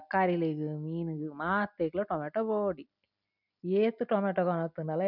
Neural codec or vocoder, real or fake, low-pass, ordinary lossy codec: none; real; 5.4 kHz; none